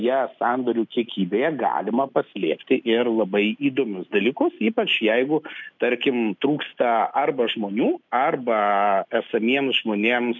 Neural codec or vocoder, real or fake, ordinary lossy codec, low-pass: none; real; MP3, 64 kbps; 7.2 kHz